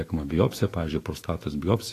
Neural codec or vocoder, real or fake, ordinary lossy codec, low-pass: autoencoder, 48 kHz, 128 numbers a frame, DAC-VAE, trained on Japanese speech; fake; AAC, 48 kbps; 14.4 kHz